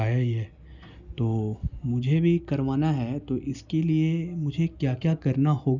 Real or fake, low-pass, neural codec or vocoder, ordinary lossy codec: real; 7.2 kHz; none; none